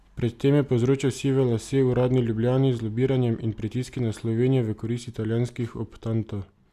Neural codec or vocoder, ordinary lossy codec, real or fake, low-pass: none; Opus, 64 kbps; real; 14.4 kHz